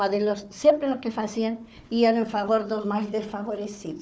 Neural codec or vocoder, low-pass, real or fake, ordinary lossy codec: codec, 16 kHz, 4 kbps, FunCodec, trained on Chinese and English, 50 frames a second; none; fake; none